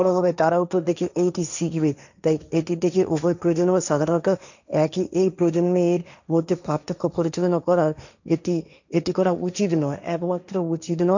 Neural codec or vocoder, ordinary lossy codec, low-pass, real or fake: codec, 16 kHz, 1.1 kbps, Voila-Tokenizer; none; 7.2 kHz; fake